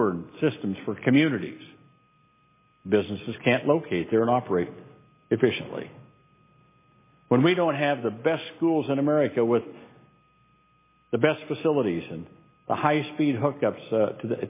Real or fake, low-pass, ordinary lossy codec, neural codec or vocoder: real; 3.6 kHz; MP3, 16 kbps; none